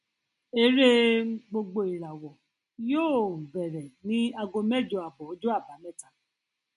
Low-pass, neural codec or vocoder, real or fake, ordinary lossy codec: 14.4 kHz; none; real; MP3, 48 kbps